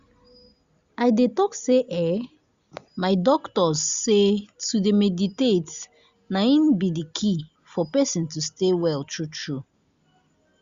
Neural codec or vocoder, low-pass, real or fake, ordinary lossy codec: none; 7.2 kHz; real; Opus, 64 kbps